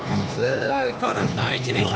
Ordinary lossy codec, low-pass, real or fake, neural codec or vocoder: none; none; fake; codec, 16 kHz, 2 kbps, X-Codec, HuBERT features, trained on LibriSpeech